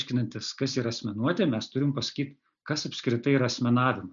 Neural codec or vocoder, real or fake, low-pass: none; real; 7.2 kHz